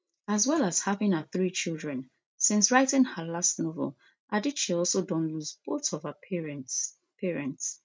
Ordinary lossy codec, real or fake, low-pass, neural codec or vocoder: none; real; none; none